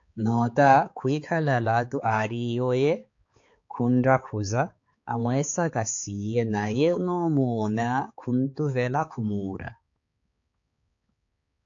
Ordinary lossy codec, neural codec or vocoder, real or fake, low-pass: AAC, 48 kbps; codec, 16 kHz, 4 kbps, X-Codec, HuBERT features, trained on balanced general audio; fake; 7.2 kHz